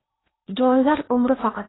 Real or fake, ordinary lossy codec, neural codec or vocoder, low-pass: fake; AAC, 16 kbps; codec, 16 kHz in and 24 kHz out, 0.8 kbps, FocalCodec, streaming, 65536 codes; 7.2 kHz